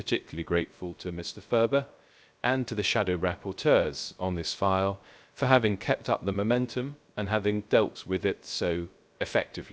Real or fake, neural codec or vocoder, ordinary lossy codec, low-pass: fake; codec, 16 kHz, 0.3 kbps, FocalCodec; none; none